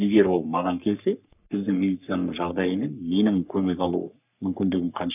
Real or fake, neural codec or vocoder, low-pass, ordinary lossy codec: fake; codec, 44.1 kHz, 3.4 kbps, Pupu-Codec; 3.6 kHz; none